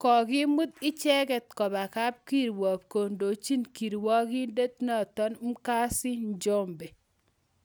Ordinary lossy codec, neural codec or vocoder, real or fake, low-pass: none; none; real; none